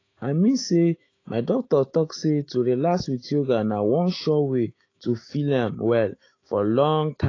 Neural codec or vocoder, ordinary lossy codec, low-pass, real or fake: autoencoder, 48 kHz, 128 numbers a frame, DAC-VAE, trained on Japanese speech; AAC, 32 kbps; 7.2 kHz; fake